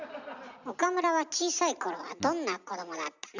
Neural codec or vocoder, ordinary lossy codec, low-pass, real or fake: none; none; 7.2 kHz; real